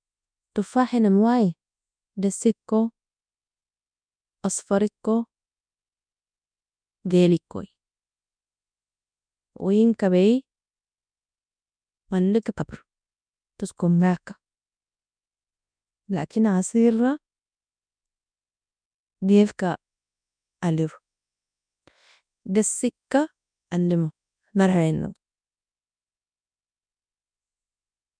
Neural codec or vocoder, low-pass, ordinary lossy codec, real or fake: codec, 24 kHz, 0.9 kbps, WavTokenizer, large speech release; 9.9 kHz; none; fake